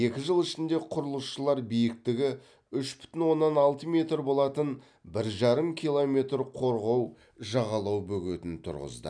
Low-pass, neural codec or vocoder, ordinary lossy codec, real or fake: 9.9 kHz; none; none; real